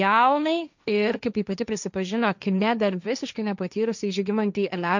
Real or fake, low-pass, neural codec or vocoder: fake; 7.2 kHz; codec, 16 kHz, 1.1 kbps, Voila-Tokenizer